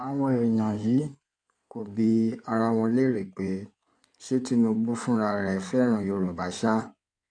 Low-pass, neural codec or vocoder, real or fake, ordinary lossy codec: 9.9 kHz; codec, 16 kHz in and 24 kHz out, 2.2 kbps, FireRedTTS-2 codec; fake; none